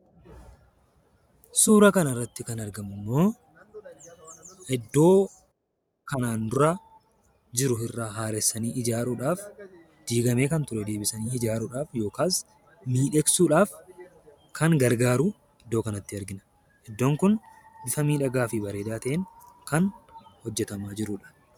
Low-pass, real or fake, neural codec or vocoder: 19.8 kHz; fake; vocoder, 44.1 kHz, 128 mel bands every 512 samples, BigVGAN v2